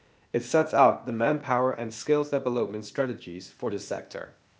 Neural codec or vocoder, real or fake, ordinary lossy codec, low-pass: codec, 16 kHz, 0.8 kbps, ZipCodec; fake; none; none